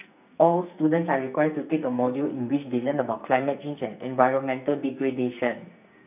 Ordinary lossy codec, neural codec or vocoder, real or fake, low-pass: none; codec, 44.1 kHz, 2.6 kbps, SNAC; fake; 3.6 kHz